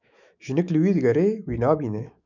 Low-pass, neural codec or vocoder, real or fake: 7.2 kHz; autoencoder, 48 kHz, 128 numbers a frame, DAC-VAE, trained on Japanese speech; fake